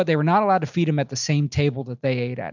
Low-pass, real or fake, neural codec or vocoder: 7.2 kHz; real; none